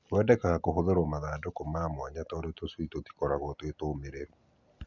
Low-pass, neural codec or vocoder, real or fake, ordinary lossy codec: 7.2 kHz; none; real; none